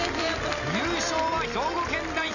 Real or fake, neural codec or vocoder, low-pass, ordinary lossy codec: real; none; 7.2 kHz; none